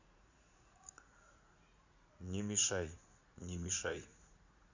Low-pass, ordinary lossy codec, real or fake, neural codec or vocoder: 7.2 kHz; Opus, 64 kbps; fake; vocoder, 44.1 kHz, 80 mel bands, Vocos